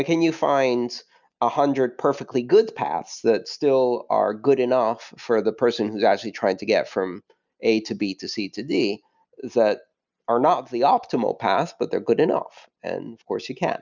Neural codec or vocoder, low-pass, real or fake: none; 7.2 kHz; real